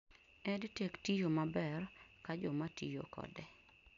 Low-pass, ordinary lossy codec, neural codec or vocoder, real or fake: 7.2 kHz; none; none; real